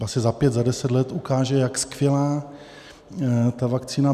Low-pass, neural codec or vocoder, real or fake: 14.4 kHz; none; real